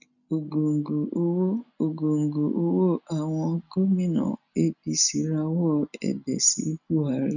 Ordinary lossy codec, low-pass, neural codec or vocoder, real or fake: none; 7.2 kHz; none; real